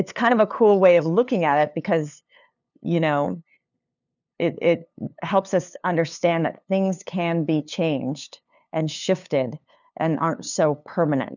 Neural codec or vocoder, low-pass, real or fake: codec, 16 kHz, 2 kbps, FunCodec, trained on LibriTTS, 25 frames a second; 7.2 kHz; fake